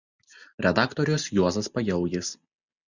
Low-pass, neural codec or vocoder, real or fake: 7.2 kHz; none; real